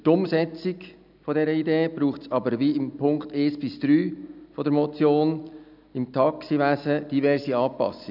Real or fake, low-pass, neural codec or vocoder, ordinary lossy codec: real; 5.4 kHz; none; none